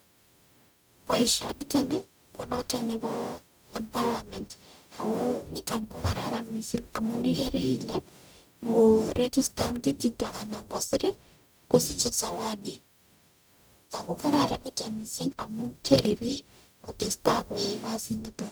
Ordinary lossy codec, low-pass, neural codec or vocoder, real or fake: none; none; codec, 44.1 kHz, 0.9 kbps, DAC; fake